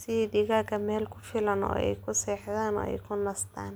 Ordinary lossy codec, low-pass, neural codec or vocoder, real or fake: none; none; none; real